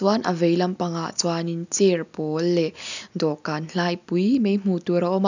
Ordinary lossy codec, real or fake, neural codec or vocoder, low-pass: none; real; none; 7.2 kHz